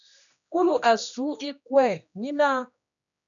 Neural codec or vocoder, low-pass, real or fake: codec, 16 kHz, 1 kbps, X-Codec, HuBERT features, trained on general audio; 7.2 kHz; fake